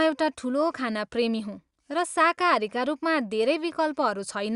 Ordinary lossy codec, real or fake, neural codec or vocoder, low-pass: Opus, 64 kbps; real; none; 10.8 kHz